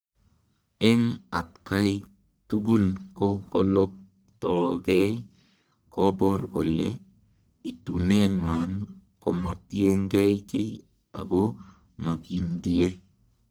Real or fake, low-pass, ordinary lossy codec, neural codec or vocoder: fake; none; none; codec, 44.1 kHz, 1.7 kbps, Pupu-Codec